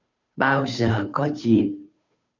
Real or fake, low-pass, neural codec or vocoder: fake; 7.2 kHz; codec, 16 kHz, 2 kbps, FunCodec, trained on Chinese and English, 25 frames a second